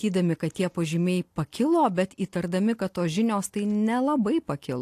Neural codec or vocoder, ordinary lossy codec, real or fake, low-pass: none; AAC, 64 kbps; real; 14.4 kHz